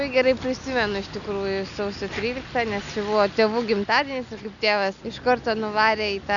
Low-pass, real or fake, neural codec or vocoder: 7.2 kHz; real; none